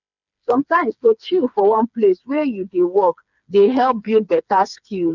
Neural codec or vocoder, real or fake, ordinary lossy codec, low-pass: codec, 16 kHz, 4 kbps, FreqCodec, smaller model; fake; none; 7.2 kHz